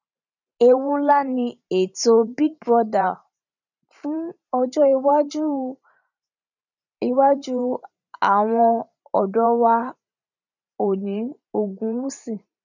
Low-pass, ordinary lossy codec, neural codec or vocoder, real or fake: 7.2 kHz; none; vocoder, 44.1 kHz, 128 mel bands every 512 samples, BigVGAN v2; fake